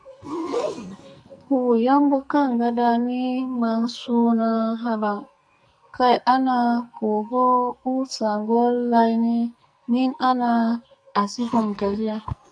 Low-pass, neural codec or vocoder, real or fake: 9.9 kHz; codec, 44.1 kHz, 2.6 kbps, SNAC; fake